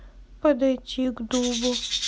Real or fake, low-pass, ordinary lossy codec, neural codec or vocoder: real; none; none; none